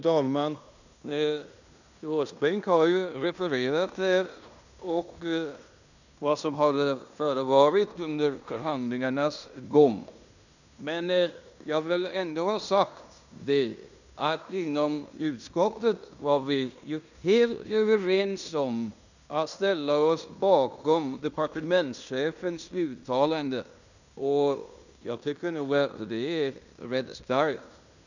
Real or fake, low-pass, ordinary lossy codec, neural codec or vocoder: fake; 7.2 kHz; none; codec, 16 kHz in and 24 kHz out, 0.9 kbps, LongCat-Audio-Codec, fine tuned four codebook decoder